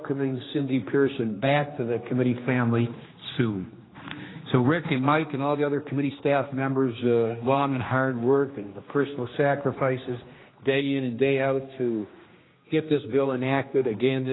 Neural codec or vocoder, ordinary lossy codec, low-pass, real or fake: codec, 16 kHz, 2 kbps, X-Codec, HuBERT features, trained on general audio; AAC, 16 kbps; 7.2 kHz; fake